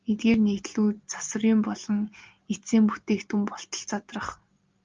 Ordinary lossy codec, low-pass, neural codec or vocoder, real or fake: Opus, 32 kbps; 7.2 kHz; none; real